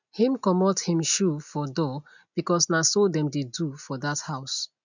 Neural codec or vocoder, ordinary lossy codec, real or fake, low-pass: none; none; real; 7.2 kHz